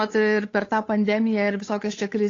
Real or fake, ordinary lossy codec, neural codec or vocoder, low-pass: fake; AAC, 32 kbps; codec, 16 kHz, 8 kbps, FunCodec, trained on Chinese and English, 25 frames a second; 7.2 kHz